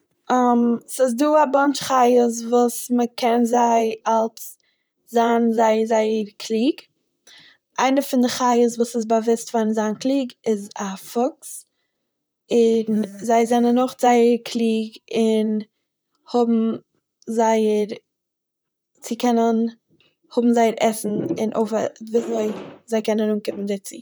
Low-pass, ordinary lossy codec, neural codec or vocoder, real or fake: none; none; vocoder, 44.1 kHz, 128 mel bands, Pupu-Vocoder; fake